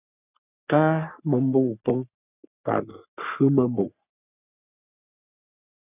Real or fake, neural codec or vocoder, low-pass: fake; codec, 44.1 kHz, 3.4 kbps, Pupu-Codec; 3.6 kHz